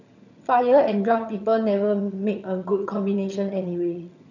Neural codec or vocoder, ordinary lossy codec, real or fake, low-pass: vocoder, 22.05 kHz, 80 mel bands, HiFi-GAN; none; fake; 7.2 kHz